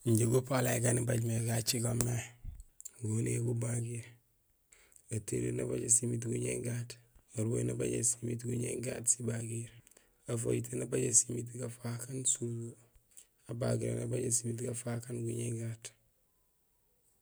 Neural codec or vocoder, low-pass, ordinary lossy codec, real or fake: none; none; none; real